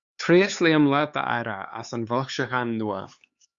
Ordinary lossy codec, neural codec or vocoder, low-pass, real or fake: Opus, 64 kbps; codec, 16 kHz, 4 kbps, X-Codec, HuBERT features, trained on LibriSpeech; 7.2 kHz; fake